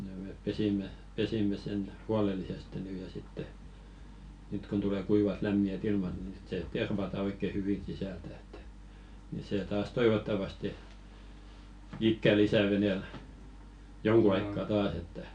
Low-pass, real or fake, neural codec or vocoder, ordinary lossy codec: 9.9 kHz; real; none; none